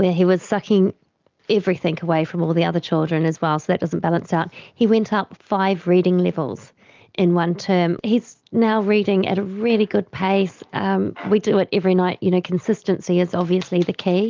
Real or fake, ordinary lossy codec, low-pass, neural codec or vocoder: real; Opus, 24 kbps; 7.2 kHz; none